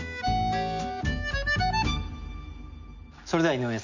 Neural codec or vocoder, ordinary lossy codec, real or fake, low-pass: none; none; real; 7.2 kHz